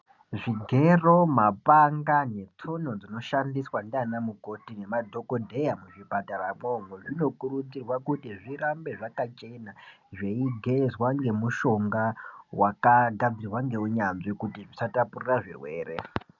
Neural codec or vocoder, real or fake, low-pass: none; real; 7.2 kHz